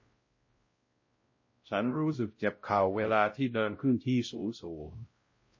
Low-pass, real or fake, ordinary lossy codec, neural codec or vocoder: 7.2 kHz; fake; MP3, 32 kbps; codec, 16 kHz, 0.5 kbps, X-Codec, WavLM features, trained on Multilingual LibriSpeech